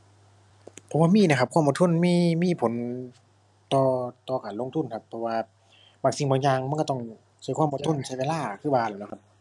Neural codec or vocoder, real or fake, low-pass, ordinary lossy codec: none; real; none; none